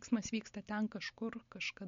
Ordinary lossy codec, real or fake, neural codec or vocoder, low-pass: MP3, 48 kbps; real; none; 7.2 kHz